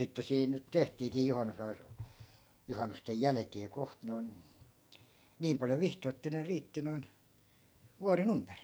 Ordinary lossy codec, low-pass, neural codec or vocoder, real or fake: none; none; codec, 44.1 kHz, 2.6 kbps, SNAC; fake